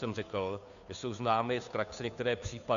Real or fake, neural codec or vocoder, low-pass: fake; codec, 16 kHz, 2 kbps, FunCodec, trained on Chinese and English, 25 frames a second; 7.2 kHz